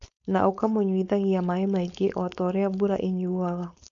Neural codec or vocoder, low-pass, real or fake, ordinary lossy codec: codec, 16 kHz, 4.8 kbps, FACodec; 7.2 kHz; fake; none